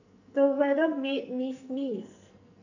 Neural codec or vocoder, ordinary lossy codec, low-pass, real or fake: codec, 44.1 kHz, 2.6 kbps, SNAC; none; 7.2 kHz; fake